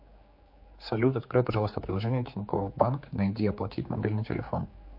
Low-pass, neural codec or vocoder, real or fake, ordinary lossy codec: 5.4 kHz; codec, 16 kHz, 4 kbps, X-Codec, HuBERT features, trained on general audio; fake; MP3, 32 kbps